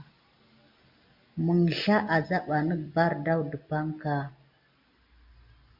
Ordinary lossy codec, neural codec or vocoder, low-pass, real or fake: MP3, 48 kbps; none; 5.4 kHz; real